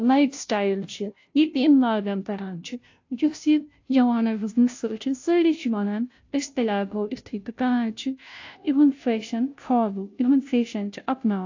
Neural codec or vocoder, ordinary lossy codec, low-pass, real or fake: codec, 16 kHz, 0.5 kbps, FunCodec, trained on Chinese and English, 25 frames a second; AAC, 48 kbps; 7.2 kHz; fake